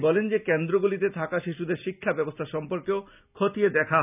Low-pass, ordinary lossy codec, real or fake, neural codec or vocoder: 3.6 kHz; none; real; none